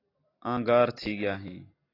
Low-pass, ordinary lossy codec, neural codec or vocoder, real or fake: 5.4 kHz; AAC, 24 kbps; none; real